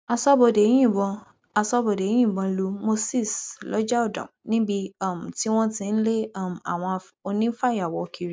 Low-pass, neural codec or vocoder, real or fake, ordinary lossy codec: none; none; real; none